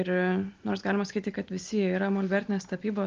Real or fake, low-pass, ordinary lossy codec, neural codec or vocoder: real; 7.2 kHz; Opus, 24 kbps; none